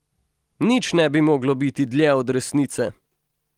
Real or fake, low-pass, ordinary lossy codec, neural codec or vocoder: real; 19.8 kHz; Opus, 24 kbps; none